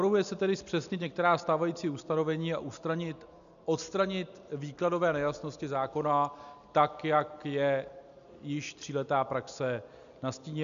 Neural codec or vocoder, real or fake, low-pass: none; real; 7.2 kHz